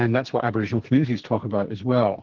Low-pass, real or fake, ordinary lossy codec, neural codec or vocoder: 7.2 kHz; fake; Opus, 16 kbps; codec, 44.1 kHz, 2.6 kbps, SNAC